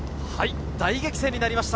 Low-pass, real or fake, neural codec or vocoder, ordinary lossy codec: none; real; none; none